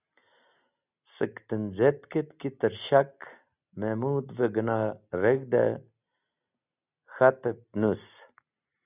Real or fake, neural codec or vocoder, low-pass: real; none; 3.6 kHz